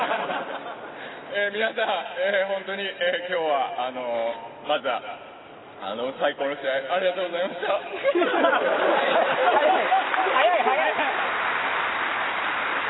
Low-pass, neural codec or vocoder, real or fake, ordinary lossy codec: 7.2 kHz; none; real; AAC, 16 kbps